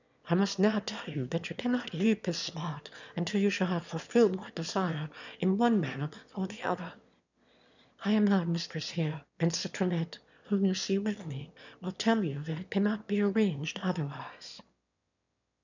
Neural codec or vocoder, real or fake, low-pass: autoencoder, 22.05 kHz, a latent of 192 numbers a frame, VITS, trained on one speaker; fake; 7.2 kHz